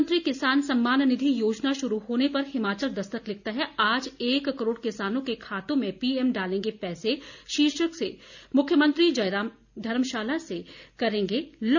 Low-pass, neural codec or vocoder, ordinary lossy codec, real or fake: 7.2 kHz; none; none; real